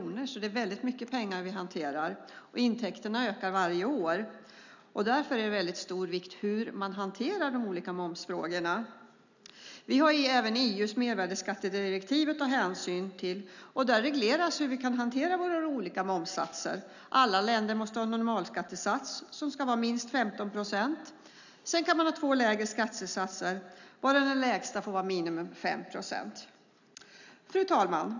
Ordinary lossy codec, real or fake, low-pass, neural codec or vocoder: none; real; 7.2 kHz; none